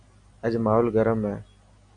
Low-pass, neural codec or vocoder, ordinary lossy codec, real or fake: 9.9 kHz; none; MP3, 96 kbps; real